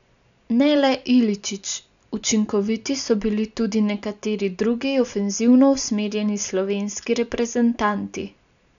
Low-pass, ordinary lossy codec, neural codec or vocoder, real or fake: 7.2 kHz; none; none; real